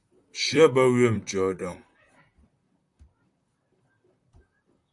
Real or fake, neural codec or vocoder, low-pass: fake; vocoder, 44.1 kHz, 128 mel bands, Pupu-Vocoder; 10.8 kHz